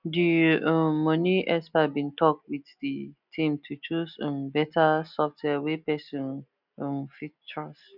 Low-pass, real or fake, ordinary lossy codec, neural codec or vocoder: 5.4 kHz; real; none; none